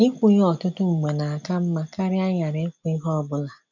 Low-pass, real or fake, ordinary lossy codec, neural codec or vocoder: 7.2 kHz; real; none; none